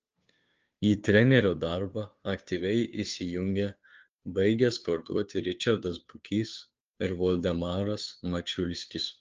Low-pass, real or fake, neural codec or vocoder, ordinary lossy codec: 7.2 kHz; fake; codec, 16 kHz, 2 kbps, FunCodec, trained on Chinese and English, 25 frames a second; Opus, 24 kbps